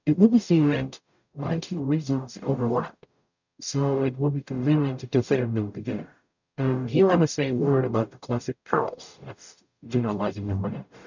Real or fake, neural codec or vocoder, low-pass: fake; codec, 44.1 kHz, 0.9 kbps, DAC; 7.2 kHz